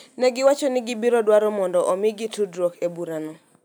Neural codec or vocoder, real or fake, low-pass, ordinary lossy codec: none; real; none; none